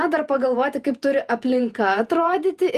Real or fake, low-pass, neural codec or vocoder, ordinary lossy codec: fake; 14.4 kHz; vocoder, 48 kHz, 128 mel bands, Vocos; Opus, 32 kbps